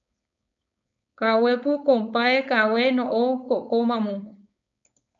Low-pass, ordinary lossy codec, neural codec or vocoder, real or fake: 7.2 kHz; MP3, 96 kbps; codec, 16 kHz, 4.8 kbps, FACodec; fake